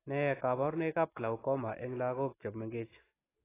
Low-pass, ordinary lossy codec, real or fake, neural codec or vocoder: 3.6 kHz; AAC, 16 kbps; real; none